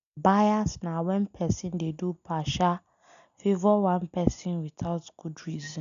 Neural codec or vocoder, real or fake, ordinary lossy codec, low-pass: none; real; none; 7.2 kHz